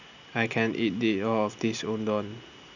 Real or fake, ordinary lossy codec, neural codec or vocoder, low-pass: real; none; none; 7.2 kHz